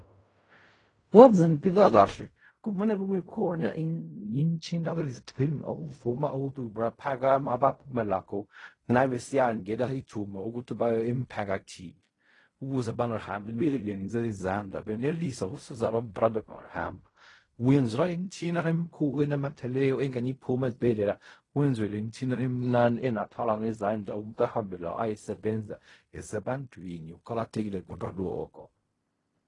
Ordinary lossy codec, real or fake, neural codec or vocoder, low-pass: AAC, 32 kbps; fake; codec, 16 kHz in and 24 kHz out, 0.4 kbps, LongCat-Audio-Codec, fine tuned four codebook decoder; 10.8 kHz